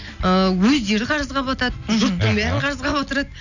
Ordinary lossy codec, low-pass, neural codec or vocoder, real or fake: none; 7.2 kHz; none; real